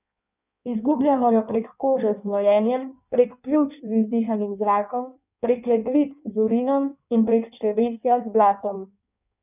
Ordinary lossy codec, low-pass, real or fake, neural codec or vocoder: none; 3.6 kHz; fake; codec, 16 kHz in and 24 kHz out, 1.1 kbps, FireRedTTS-2 codec